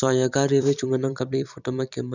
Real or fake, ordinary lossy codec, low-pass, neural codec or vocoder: fake; none; 7.2 kHz; vocoder, 44.1 kHz, 128 mel bands every 256 samples, BigVGAN v2